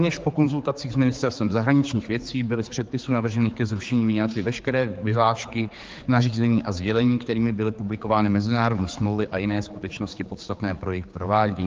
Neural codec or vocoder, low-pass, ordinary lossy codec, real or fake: codec, 16 kHz, 4 kbps, X-Codec, HuBERT features, trained on general audio; 7.2 kHz; Opus, 32 kbps; fake